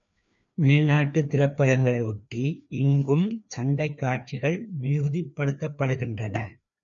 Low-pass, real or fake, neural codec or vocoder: 7.2 kHz; fake; codec, 16 kHz, 2 kbps, FreqCodec, larger model